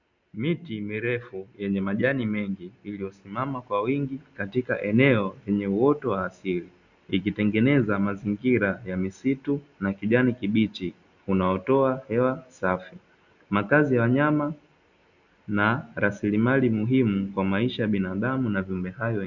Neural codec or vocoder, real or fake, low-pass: none; real; 7.2 kHz